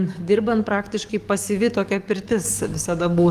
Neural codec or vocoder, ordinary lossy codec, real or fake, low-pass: codec, 44.1 kHz, 7.8 kbps, DAC; Opus, 24 kbps; fake; 14.4 kHz